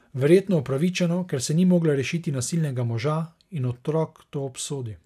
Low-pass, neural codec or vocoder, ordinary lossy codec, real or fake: 14.4 kHz; none; none; real